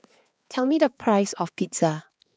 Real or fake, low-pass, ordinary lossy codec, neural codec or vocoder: fake; none; none; codec, 16 kHz, 4 kbps, X-Codec, HuBERT features, trained on balanced general audio